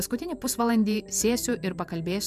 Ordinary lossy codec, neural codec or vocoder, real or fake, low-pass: MP3, 96 kbps; vocoder, 48 kHz, 128 mel bands, Vocos; fake; 14.4 kHz